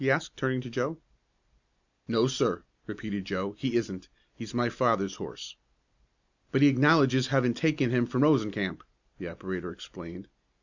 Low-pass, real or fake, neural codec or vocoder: 7.2 kHz; real; none